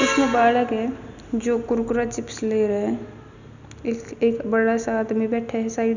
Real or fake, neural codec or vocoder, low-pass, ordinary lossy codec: real; none; 7.2 kHz; none